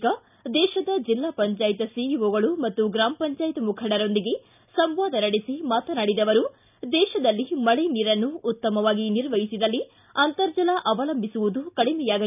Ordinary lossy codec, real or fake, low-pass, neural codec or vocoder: none; real; 3.6 kHz; none